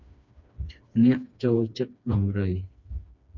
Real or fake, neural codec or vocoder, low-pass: fake; codec, 16 kHz, 2 kbps, FreqCodec, smaller model; 7.2 kHz